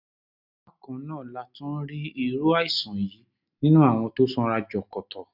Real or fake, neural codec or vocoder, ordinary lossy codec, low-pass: real; none; none; 5.4 kHz